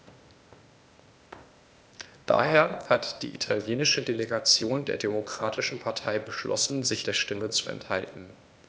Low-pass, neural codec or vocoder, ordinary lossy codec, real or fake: none; codec, 16 kHz, 0.8 kbps, ZipCodec; none; fake